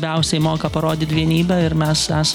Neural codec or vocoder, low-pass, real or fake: none; 19.8 kHz; real